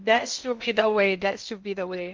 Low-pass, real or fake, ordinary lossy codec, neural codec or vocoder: 7.2 kHz; fake; Opus, 32 kbps; codec, 16 kHz in and 24 kHz out, 0.6 kbps, FocalCodec, streaming, 2048 codes